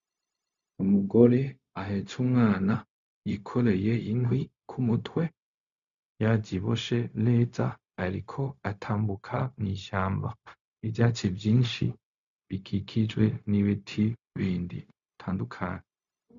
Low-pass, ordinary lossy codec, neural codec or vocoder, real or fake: 7.2 kHz; Opus, 64 kbps; codec, 16 kHz, 0.4 kbps, LongCat-Audio-Codec; fake